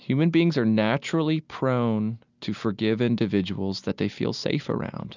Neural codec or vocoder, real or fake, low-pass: none; real; 7.2 kHz